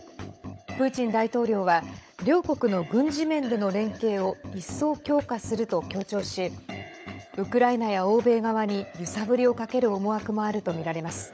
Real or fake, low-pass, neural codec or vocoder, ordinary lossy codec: fake; none; codec, 16 kHz, 16 kbps, FunCodec, trained on LibriTTS, 50 frames a second; none